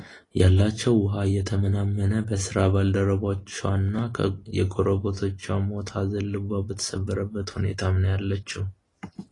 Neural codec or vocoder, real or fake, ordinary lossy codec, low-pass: none; real; AAC, 32 kbps; 10.8 kHz